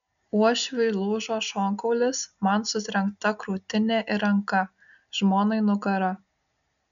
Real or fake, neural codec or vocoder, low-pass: real; none; 7.2 kHz